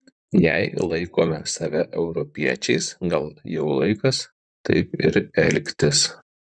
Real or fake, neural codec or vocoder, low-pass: fake; vocoder, 44.1 kHz, 128 mel bands, Pupu-Vocoder; 9.9 kHz